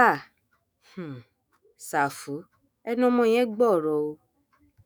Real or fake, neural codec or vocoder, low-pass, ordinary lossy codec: fake; autoencoder, 48 kHz, 128 numbers a frame, DAC-VAE, trained on Japanese speech; none; none